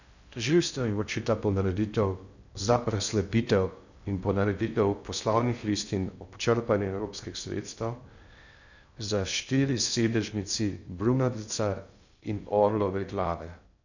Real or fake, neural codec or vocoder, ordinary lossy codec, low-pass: fake; codec, 16 kHz in and 24 kHz out, 0.6 kbps, FocalCodec, streaming, 2048 codes; none; 7.2 kHz